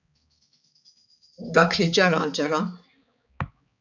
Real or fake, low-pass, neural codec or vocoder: fake; 7.2 kHz; codec, 16 kHz, 4 kbps, X-Codec, HuBERT features, trained on balanced general audio